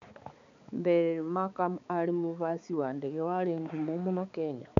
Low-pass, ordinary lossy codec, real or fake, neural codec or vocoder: 7.2 kHz; MP3, 64 kbps; fake; codec, 16 kHz, 4 kbps, X-Codec, HuBERT features, trained on balanced general audio